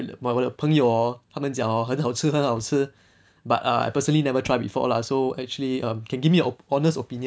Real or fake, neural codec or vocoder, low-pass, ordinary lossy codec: real; none; none; none